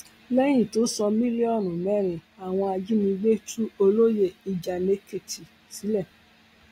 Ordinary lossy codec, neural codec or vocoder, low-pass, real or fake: AAC, 48 kbps; none; 19.8 kHz; real